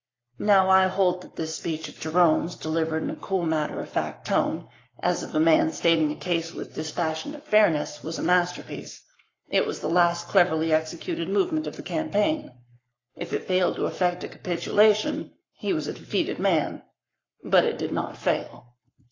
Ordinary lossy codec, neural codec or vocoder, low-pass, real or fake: AAC, 32 kbps; codec, 44.1 kHz, 7.8 kbps, Pupu-Codec; 7.2 kHz; fake